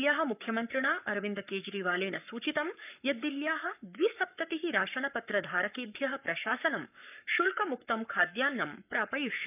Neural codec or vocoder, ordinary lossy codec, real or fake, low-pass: codec, 44.1 kHz, 7.8 kbps, Pupu-Codec; none; fake; 3.6 kHz